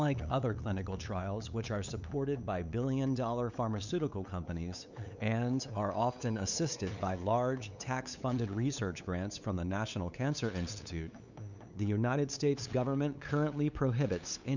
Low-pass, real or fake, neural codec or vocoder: 7.2 kHz; fake; codec, 16 kHz, 8 kbps, FunCodec, trained on LibriTTS, 25 frames a second